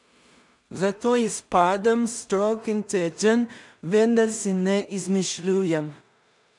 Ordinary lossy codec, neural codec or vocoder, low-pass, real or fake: none; codec, 16 kHz in and 24 kHz out, 0.4 kbps, LongCat-Audio-Codec, two codebook decoder; 10.8 kHz; fake